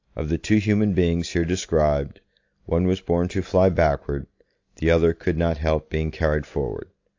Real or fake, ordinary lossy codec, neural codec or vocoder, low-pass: real; AAC, 48 kbps; none; 7.2 kHz